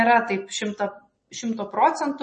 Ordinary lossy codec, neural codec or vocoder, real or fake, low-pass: MP3, 32 kbps; none; real; 10.8 kHz